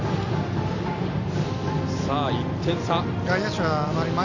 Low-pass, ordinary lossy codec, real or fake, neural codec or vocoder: 7.2 kHz; none; real; none